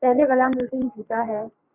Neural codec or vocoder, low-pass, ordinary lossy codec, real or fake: codec, 44.1 kHz, 3.4 kbps, Pupu-Codec; 3.6 kHz; Opus, 64 kbps; fake